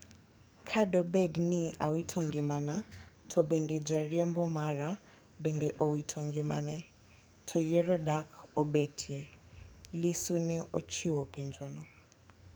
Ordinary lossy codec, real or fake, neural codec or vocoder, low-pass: none; fake; codec, 44.1 kHz, 2.6 kbps, SNAC; none